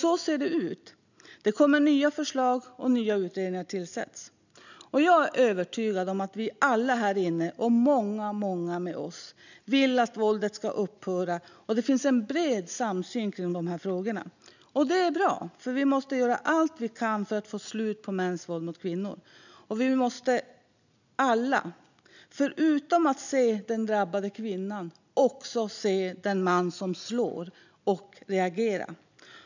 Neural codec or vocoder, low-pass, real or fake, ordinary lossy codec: none; 7.2 kHz; real; none